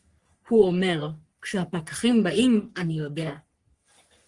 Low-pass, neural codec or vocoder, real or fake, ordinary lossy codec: 10.8 kHz; codec, 44.1 kHz, 3.4 kbps, Pupu-Codec; fake; Opus, 24 kbps